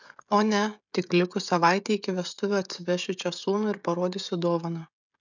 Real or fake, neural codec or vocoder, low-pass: fake; codec, 16 kHz, 16 kbps, FreqCodec, smaller model; 7.2 kHz